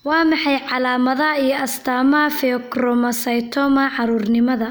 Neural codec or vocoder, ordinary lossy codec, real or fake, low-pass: none; none; real; none